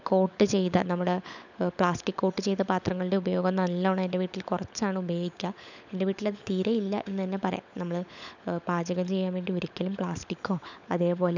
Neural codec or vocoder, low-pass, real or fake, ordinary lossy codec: codec, 16 kHz, 16 kbps, FunCodec, trained on LibriTTS, 50 frames a second; 7.2 kHz; fake; none